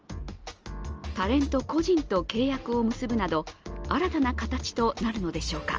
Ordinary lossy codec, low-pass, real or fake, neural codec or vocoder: Opus, 24 kbps; 7.2 kHz; real; none